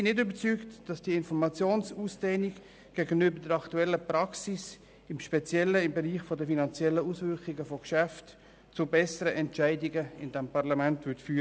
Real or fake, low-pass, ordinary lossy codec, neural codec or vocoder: real; none; none; none